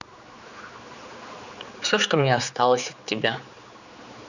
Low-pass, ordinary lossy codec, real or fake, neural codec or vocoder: 7.2 kHz; none; fake; codec, 16 kHz, 4 kbps, X-Codec, HuBERT features, trained on general audio